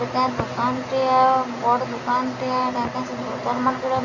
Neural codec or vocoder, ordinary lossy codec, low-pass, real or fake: none; none; 7.2 kHz; real